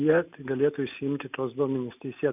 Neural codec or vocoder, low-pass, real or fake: none; 3.6 kHz; real